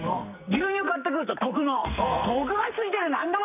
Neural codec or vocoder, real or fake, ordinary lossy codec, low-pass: codec, 44.1 kHz, 2.6 kbps, SNAC; fake; none; 3.6 kHz